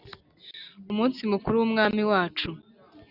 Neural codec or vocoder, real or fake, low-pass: none; real; 5.4 kHz